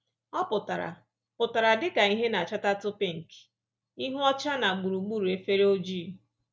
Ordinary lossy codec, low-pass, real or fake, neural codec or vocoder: none; none; real; none